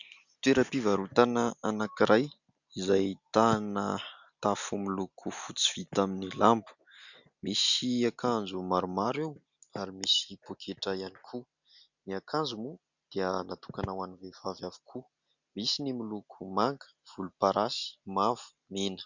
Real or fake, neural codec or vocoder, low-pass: real; none; 7.2 kHz